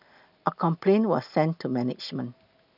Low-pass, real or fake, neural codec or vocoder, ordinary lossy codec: 5.4 kHz; real; none; none